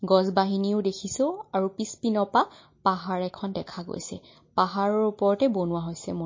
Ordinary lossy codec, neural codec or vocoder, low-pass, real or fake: MP3, 32 kbps; none; 7.2 kHz; real